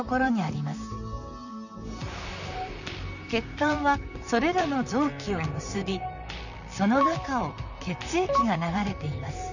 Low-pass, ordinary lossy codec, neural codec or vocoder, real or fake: 7.2 kHz; none; vocoder, 44.1 kHz, 128 mel bands, Pupu-Vocoder; fake